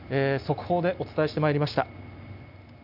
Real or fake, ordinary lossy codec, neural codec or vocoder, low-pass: real; none; none; 5.4 kHz